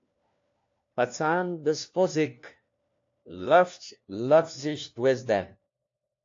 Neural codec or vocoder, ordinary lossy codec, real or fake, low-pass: codec, 16 kHz, 1 kbps, FunCodec, trained on LibriTTS, 50 frames a second; AAC, 48 kbps; fake; 7.2 kHz